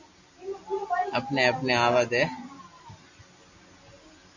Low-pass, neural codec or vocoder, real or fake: 7.2 kHz; none; real